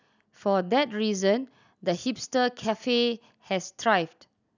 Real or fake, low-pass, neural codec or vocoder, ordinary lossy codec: real; 7.2 kHz; none; none